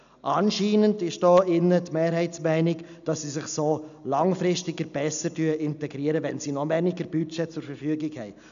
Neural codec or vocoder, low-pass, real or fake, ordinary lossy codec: none; 7.2 kHz; real; none